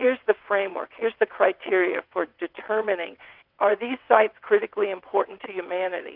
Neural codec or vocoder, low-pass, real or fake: vocoder, 22.05 kHz, 80 mel bands, WaveNeXt; 5.4 kHz; fake